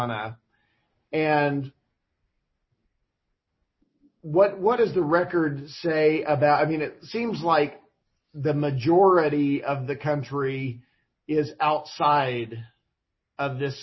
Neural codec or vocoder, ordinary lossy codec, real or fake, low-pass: none; MP3, 24 kbps; real; 7.2 kHz